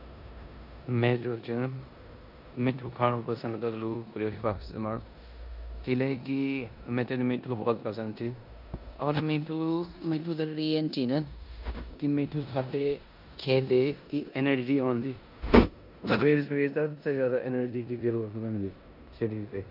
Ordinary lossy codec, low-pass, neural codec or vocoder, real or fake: none; 5.4 kHz; codec, 16 kHz in and 24 kHz out, 0.9 kbps, LongCat-Audio-Codec, four codebook decoder; fake